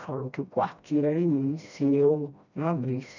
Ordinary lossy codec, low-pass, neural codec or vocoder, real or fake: none; 7.2 kHz; codec, 16 kHz, 1 kbps, FreqCodec, smaller model; fake